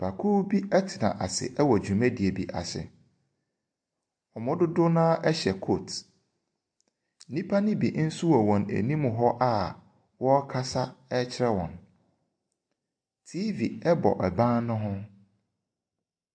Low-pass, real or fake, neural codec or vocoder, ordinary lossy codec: 9.9 kHz; real; none; AAC, 64 kbps